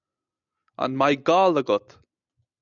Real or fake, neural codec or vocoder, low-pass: real; none; 7.2 kHz